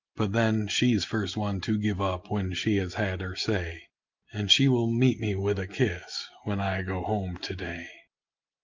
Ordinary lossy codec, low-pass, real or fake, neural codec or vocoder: Opus, 32 kbps; 7.2 kHz; real; none